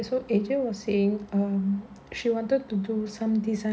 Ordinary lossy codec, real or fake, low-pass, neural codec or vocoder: none; real; none; none